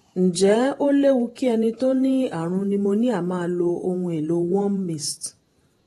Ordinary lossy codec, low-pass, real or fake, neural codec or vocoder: AAC, 32 kbps; 19.8 kHz; fake; vocoder, 48 kHz, 128 mel bands, Vocos